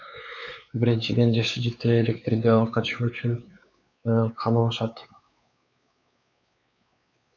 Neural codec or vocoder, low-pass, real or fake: codec, 16 kHz, 4 kbps, X-Codec, WavLM features, trained on Multilingual LibriSpeech; 7.2 kHz; fake